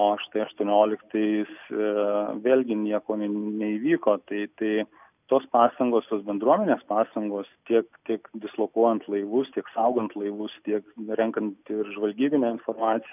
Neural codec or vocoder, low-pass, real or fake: none; 3.6 kHz; real